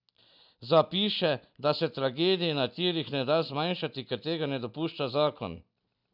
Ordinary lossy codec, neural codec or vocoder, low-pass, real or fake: none; none; 5.4 kHz; real